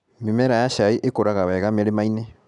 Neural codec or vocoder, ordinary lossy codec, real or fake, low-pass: none; none; real; 10.8 kHz